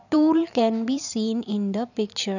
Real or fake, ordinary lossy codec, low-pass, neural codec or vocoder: fake; none; 7.2 kHz; vocoder, 44.1 kHz, 128 mel bands every 512 samples, BigVGAN v2